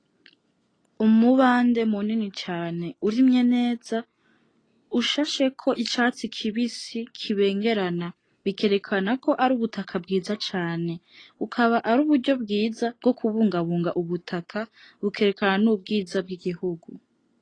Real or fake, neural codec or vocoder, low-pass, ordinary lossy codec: real; none; 9.9 kHz; AAC, 32 kbps